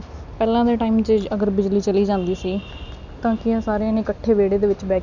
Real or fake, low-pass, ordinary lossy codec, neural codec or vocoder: real; 7.2 kHz; none; none